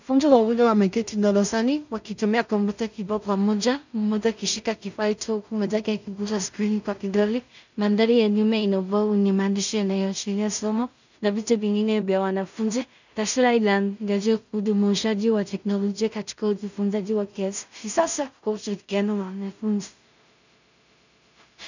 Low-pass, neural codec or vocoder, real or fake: 7.2 kHz; codec, 16 kHz in and 24 kHz out, 0.4 kbps, LongCat-Audio-Codec, two codebook decoder; fake